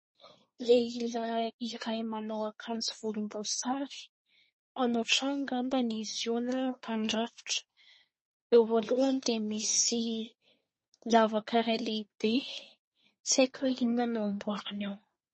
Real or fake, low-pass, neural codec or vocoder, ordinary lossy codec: fake; 10.8 kHz; codec, 24 kHz, 1 kbps, SNAC; MP3, 32 kbps